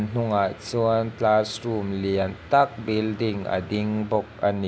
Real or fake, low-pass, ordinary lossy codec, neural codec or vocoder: real; none; none; none